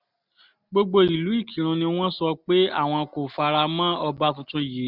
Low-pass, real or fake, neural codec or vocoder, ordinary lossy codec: 5.4 kHz; real; none; none